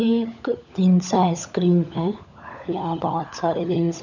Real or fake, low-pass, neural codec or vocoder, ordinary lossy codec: fake; 7.2 kHz; codec, 16 kHz, 16 kbps, FunCodec, trained on LibriTTS, 50 frames a second; none